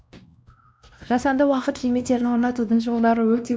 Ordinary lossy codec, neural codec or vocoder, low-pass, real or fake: none; codec, 16 kHz, 1 kbps, X-Codec, WavLM features, trained on Multilingual LibriSpeech; none; fake